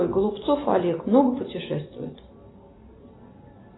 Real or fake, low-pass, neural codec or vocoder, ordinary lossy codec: real; 7.2 kHz; none; AAC, 16 kbps